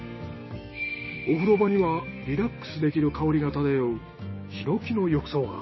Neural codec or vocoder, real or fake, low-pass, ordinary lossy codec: codec, 16 kHz, 6 kbps, DAC; fake; 7.2 kHz; MP3, 24 kbps